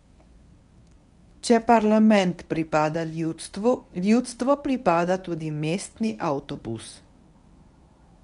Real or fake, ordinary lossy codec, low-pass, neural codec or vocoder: fake; none; 10.8 kHz; codec, 24 kHz, 0.9 kbps, WavTokenizer, medium speech release version 1